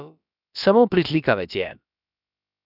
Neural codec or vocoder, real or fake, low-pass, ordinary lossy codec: codec, 16 kHz, about 1 kbps, DyCAST, with the encoder's durations; fake; 5.4 kHz; none